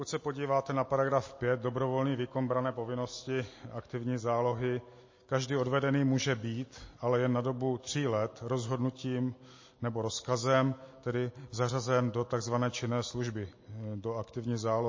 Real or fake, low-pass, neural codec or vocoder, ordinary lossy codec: real; 7.2 kHz; none; MP3, 32 kbps